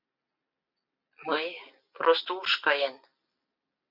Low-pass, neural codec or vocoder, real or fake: 5.4 kHz; none; real